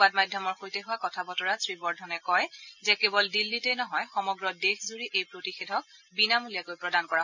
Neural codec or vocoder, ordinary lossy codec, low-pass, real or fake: none; none; 7.2 kHz; real